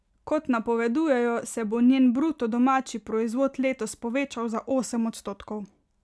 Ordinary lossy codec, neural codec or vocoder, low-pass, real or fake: none; none; none; real